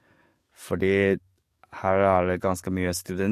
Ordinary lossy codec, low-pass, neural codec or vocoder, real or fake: MP3, 64 kbps; 14.4 kHz; codec, 44.1 kHz, 7.8 kbps, DAC; fake